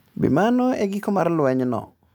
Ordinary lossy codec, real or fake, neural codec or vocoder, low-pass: none; real; none; none